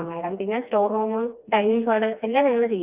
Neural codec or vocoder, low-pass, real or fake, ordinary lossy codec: codec, 16 kHz, 2 kbps, FreqCodec, smaller model; 3.6 kHz; fake; Opus, 64 kbps